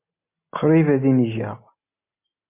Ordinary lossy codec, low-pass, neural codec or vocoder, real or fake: MP3, 32 kbps; 3.6 kHz; none; real